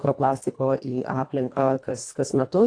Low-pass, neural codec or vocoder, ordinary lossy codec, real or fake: 9.9 kHz; codec, 24 kHz, 1.5 kbps, HILCodec; AAC, 48 kbps; fake